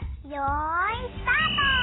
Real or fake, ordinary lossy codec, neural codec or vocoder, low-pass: real; AAC, 16 kbps; none; 7.2 kHz